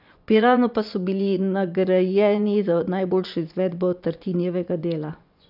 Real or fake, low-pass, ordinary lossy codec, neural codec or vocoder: fake; 5.4 kHz; none; vocoder, 24 kHz, 100 mel bands, Vocos